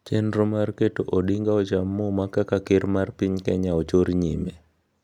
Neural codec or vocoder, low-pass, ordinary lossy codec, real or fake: none; 19.8 kHz; none; real